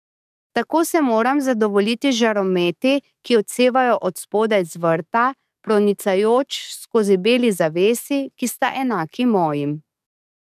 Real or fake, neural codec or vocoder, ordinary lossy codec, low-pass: fake; codec, 44.1 kHz, 7.8 kbps, DAC; none; 14.4 kHz